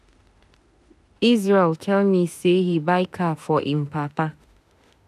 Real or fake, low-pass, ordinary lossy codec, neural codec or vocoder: fake; 14.4 kHz; none; autoencoder, 48 kHz, 32 numbers a frame, DAC-VAE, trained on Japanese speech